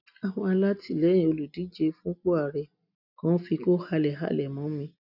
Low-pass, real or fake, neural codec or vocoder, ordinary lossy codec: 5.4 kHz; real; none; none